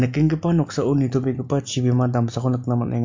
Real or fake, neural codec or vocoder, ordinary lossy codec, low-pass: real; none; MP3, 48 kbps; 7.2 kHz